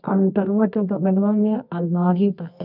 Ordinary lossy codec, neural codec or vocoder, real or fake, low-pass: none; codec, 24 kHz, 0.9 kbps, WavTokenizer, medium music audio release; fake; 5.4 kHz